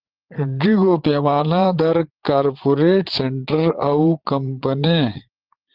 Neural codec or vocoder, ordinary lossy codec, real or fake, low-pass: vocoder, 44.1 kHz, 80 mel bands, Vocos; Opus, 16 kbps; fake; 5.4 kHz